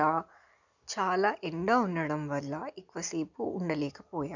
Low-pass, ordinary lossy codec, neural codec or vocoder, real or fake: 7.2 kHz; none; none; real